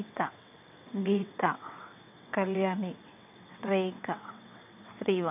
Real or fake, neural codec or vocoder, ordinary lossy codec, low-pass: fake; vocoder, 22.05 kHz, 80 mel bands, WaveNeXt; none; 3.6 kHz